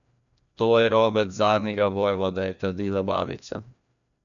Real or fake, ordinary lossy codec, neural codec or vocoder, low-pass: fake; none; codec, 16 kHz, 1 kbps, FreqCodec, larger model; 7.2 kHz